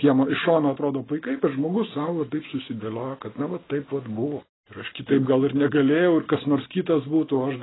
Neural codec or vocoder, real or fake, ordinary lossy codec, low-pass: none; real; AAC, 16 kbps; 7.2 kHz